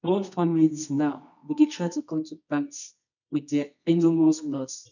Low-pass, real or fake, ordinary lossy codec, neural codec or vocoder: 7.2 kHz; fake; none; codec, 24 kHz, 0.9 kbps, WavTokenizer, medium music audio release